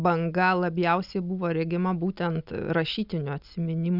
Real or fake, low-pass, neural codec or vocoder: real; 5.4 kHz; none